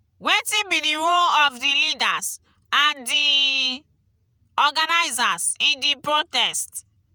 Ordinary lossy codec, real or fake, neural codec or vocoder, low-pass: none; fake; vocoder, 48 kHz, 128 mel bands, Vocos; none